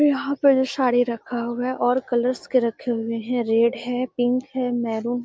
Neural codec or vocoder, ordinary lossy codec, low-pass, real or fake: none; none; none; real